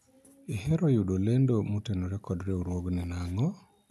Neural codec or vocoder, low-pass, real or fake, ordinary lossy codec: none; 14.4 kHz; real; none